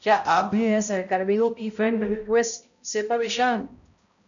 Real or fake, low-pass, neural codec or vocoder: fake; 7.2 kHz; codec, 16 kHz, 0.5 kbps, X-Codec, HuBERT features, trained on balanced general audio